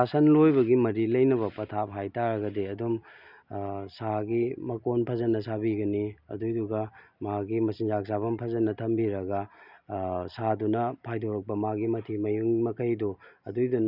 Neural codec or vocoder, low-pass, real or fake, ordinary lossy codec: none; 5.4 kHz; real; none